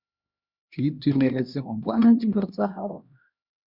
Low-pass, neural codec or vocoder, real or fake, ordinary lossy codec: 5.4 kHz; codec, 16 kHz, 1 kbps, X-Codec, HuBERT features, trained on LibriSpeech; fake; Opus, 64 kbps